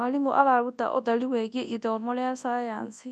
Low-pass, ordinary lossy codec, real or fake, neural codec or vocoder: none; none; fake; codec, 24 kHz, 0.9 kbps, WavTokenizer, large speech release